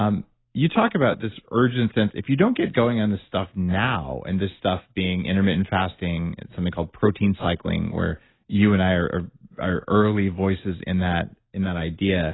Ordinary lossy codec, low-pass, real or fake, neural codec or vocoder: AAC, 16 kbps; 7.2 kHz; real; none